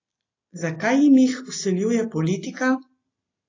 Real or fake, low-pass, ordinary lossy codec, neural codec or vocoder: real; 7.2 kHz; AAC, 32 kbps; none